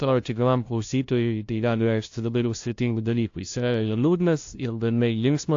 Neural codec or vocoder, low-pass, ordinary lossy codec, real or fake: codec, 16 kHz, 0.5 kbps, FunCodec, trained on LibriTTS, 25 frames a second; 7.2 kHz; AAC, 48 kbps; fake